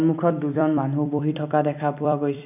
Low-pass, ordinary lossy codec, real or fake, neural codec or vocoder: 3.6 kHz; none; fake; vocoder, 44.1 kHz, 128 mel bands every 256 samples, BigVGAN v2